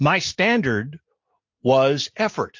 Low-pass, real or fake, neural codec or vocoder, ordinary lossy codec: 7.2 kHz; real; none; MP3, 48 kbps